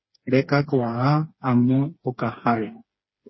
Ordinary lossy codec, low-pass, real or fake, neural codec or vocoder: MP3, 24 kbps; 7.2 kHz; fake; codec, 16 kHz, 4 kbps, FreqCodec, smaller model